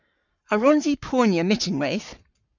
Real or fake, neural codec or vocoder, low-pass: fake; codec, 44.1 kHz, 7.8 kbps, Pupu-Codec; 7.2 kHz